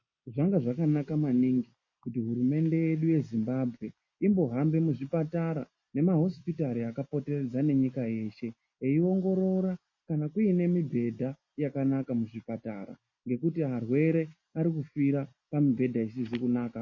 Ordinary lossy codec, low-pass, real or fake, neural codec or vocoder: MP3, 32 kbps; 7.2 kHz; real; none